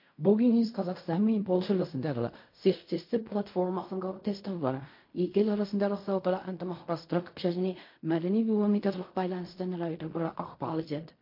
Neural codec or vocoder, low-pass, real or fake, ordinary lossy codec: codec, 16 kHz in and 24 kHz out, 0.4 kbps, LongCat-Audio-Codec, fine tuned four codebook decoder; 5.4 kHz; fake; MP3, 32 kbps